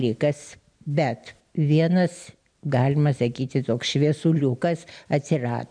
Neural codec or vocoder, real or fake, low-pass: none; real; 9.9 kHz